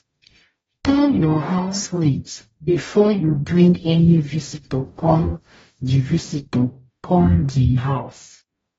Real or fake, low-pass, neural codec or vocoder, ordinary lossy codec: fake; 19.8 kHz; codec, 44.1 kHz, 0.9 kbps, DAC; AAC, 24 kbps